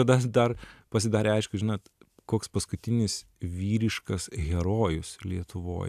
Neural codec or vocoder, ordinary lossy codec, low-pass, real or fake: none; AAC, 96 kbps; 14.4 kHz; real